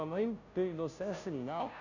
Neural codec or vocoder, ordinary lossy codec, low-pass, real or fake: codec, 16 kHz, 0.5 kbps, FunCodec, trained on Chinese and English, 25 frames a second; none; 7.2 kHz; fake